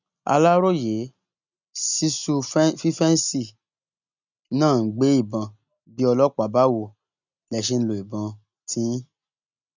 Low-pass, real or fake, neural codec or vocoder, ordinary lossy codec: 7.2 kHz; real; none; none